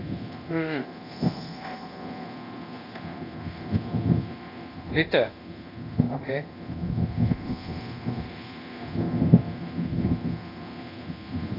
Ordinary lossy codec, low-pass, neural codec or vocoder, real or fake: AAC, 24 kbps; 5.4 kHz; codec, 24 kHz, 0.9 kbps, DualCodec; fake